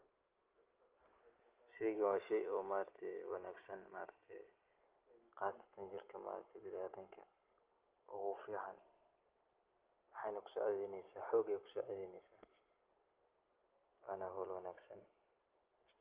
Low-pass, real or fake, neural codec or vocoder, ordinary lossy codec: 3.6 kHz; real; none; Opus, 32 kbps